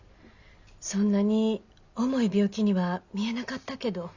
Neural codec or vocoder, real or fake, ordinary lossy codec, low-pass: none; real; Opus, 64 kbps; 7.2 kHz